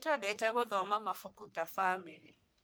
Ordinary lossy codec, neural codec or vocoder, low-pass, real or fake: none; codec, 44.1 kHz, 1.7 kbps, Pupu-Codec; none; fake